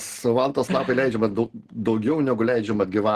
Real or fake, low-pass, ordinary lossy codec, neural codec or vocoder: real; 14.4 kHz; Opus, 16 kbps; none